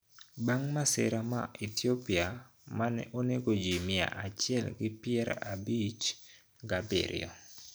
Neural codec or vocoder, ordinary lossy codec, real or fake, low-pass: none; none; real; none